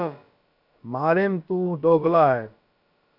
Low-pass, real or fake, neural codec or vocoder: 5.4 kHz; fake; codec, 16 kHz, about 1 kbps, DyCAST, with the encoder's durations